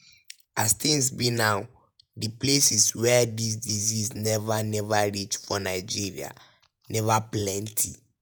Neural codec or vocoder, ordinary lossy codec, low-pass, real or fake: vocoder, 48 kHz, 128 mel bands, Vocos; none; none; fake